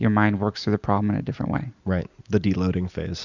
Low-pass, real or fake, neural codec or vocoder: 7.2 kHz; real; none